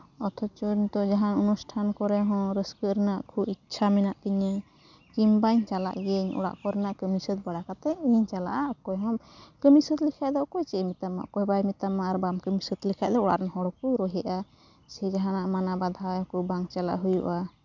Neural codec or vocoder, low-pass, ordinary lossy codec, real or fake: none; 7.2 kHz; none; real